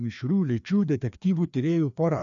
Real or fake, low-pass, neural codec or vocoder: fake; 7.2 kHz; codec, 16 kHz, 2 kbps, FreqCodec, larger model